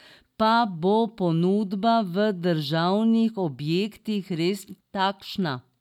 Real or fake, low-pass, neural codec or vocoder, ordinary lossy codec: real; 19.8 kHz; none; none